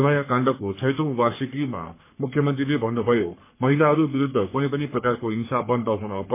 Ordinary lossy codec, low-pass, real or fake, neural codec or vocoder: MP3, 24 kbps; 3.6 kHz; fake; codec, 44.1 kHz, 3.4 kbps, Pupu-Codec